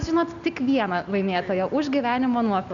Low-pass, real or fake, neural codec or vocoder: 7.2 kHz; real; none